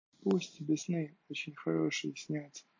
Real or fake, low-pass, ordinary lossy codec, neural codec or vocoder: real; 7.2 kHz; MP3, 32 kbps; none